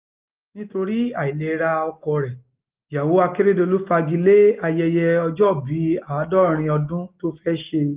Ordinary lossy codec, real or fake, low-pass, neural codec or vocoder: Opus, 32 kbps; real; 3.6 kHz; none